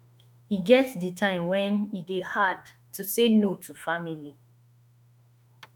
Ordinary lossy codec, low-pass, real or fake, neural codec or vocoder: none; none; fake; autoencoder, 48 kHz, 32 numbers a frame, DAC-VAE, trained on Japanese speech